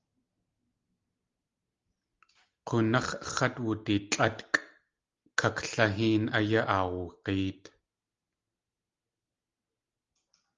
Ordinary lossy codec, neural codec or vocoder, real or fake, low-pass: Opus, 32 kbps; none; real; 7.2 kHz